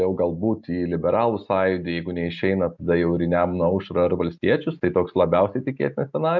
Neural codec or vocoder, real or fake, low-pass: none; real; 7.2 kHz